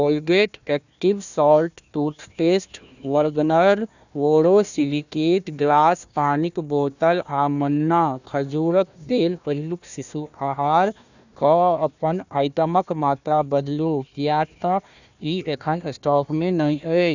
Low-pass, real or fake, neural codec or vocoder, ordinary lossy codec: 7.2 kHz; fake; codec, 16 kHz, 1 kbps, FunCodec, trained on Chinese and English, 50 frames a second; none